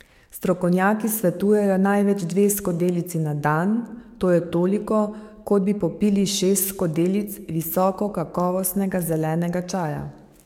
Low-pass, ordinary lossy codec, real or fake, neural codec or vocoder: 19.8 kHz; MP3, 96 kbps; fake; codec, 44.1 kHz, 7.8 kbps, DAC